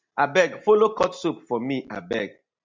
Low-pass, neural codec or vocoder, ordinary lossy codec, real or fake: 7.2 kHz; none; MP3, 64 kbps; real